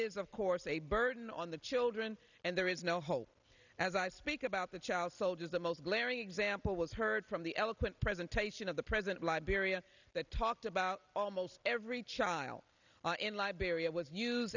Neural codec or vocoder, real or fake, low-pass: none; real; 7.2 kHz